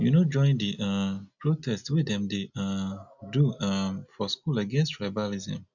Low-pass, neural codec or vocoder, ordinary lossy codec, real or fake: 7.2 kHz; none; none; real